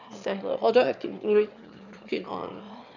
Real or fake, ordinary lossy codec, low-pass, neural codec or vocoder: fake; none; 7.2 kHz; autoencoder, 22.05 kHz, a latent of 192 numbers a frame, VITS, trained on one speaker